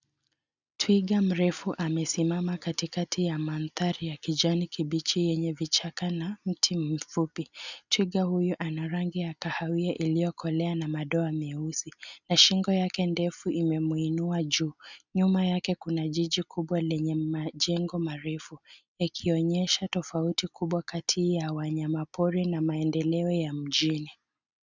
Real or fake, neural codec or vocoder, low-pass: real; none; 7.2 kHz